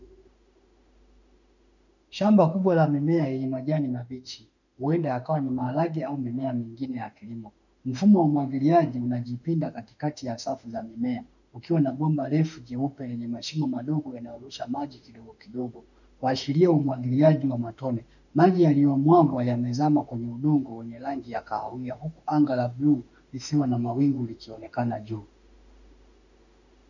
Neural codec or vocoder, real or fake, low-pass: autoencoder, 48 kHz, 32 numbers a frame, DAC-VAE, trained on Japanese speech; fake; 7.2 kHz